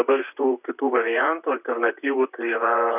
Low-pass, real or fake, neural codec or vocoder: 3.6 kHz; fake; vocoder, 44.1 kHz, 128 mel bands, Pupu-Vocoder